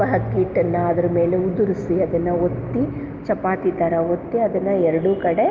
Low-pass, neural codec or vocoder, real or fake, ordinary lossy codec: 7.2 kHz; none; real; Opus, 32 kbps